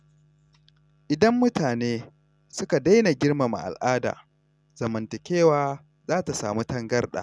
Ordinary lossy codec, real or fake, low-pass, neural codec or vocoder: none; real; none; none